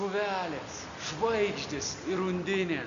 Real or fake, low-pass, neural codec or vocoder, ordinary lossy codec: real; 7.2 kHz; none; Opus, 64 kbps